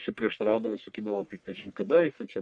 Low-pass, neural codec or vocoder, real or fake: 9.9 kHz; codec, 44.1 kHz, 1.7 kbps, Pupu-Codec; fake